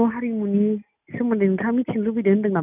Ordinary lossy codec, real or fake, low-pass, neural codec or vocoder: none; real; 3.6 kHz; none